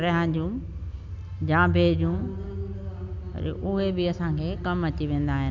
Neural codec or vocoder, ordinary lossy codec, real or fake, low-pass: none; none; real; 7.2 kHz